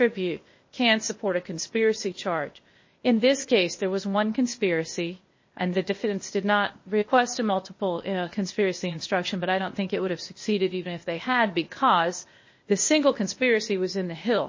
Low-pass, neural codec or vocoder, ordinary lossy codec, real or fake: 7.2 kHz; codec, 16 kHz, 0.8 kbps, ZipCodec; MP3, 32 kbps; fake